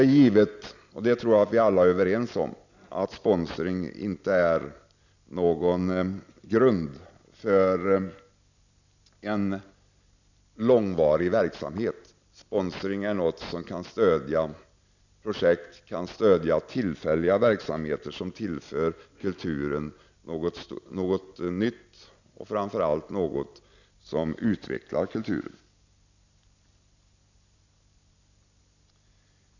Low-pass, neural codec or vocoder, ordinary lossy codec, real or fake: 7.2 kHz; none; none; real